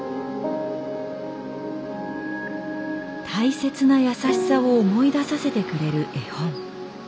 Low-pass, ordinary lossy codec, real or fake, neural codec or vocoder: none; none; real; none